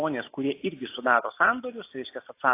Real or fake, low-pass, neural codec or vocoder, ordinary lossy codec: real; 3.6 kHz; none; AAC, 24 kbps